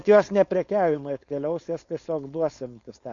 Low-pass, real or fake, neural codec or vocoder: 7.2 kHz; fake; codec, 16 kHz, 4.8 kbps, FACodec